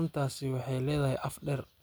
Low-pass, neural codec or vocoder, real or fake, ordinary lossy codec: none; none; real; none